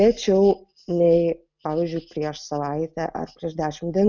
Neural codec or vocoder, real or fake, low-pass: none; real; 7.2 kHz